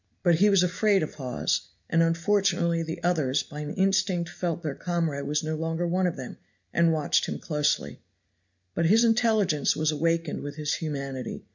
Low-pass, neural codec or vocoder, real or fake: 7.2 kHz; none; real